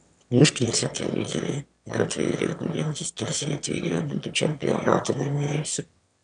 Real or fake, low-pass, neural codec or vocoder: fake; 9.9 kHz; autoencoder, 22.05 kHz, a latent of 192 numbers a frame, VITS, trained on one speaker